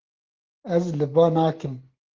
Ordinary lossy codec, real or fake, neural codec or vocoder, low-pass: Opus, 16 kbps; real; none; 7.2 kHz